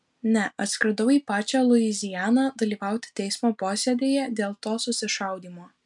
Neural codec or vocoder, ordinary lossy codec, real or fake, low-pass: none; MP3, 96 kbps; real; 10.8 kHz